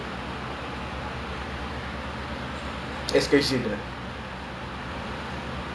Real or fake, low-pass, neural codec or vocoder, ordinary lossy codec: real; none; none; none